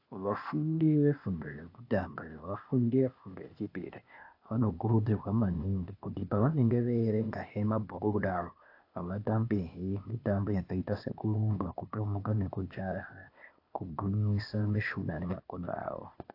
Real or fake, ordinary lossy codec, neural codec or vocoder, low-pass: fake; AAC, 32 kbps; codec, 16 kHz, 0.8 kbps, ZipCodec; 5.4 kHz